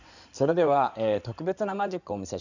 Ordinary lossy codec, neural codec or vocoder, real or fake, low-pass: none; codec, 16 kHz in and 24 kHz out, 2.2 kbps, FireRedTTS-2 codec; fake; 7.2 kHz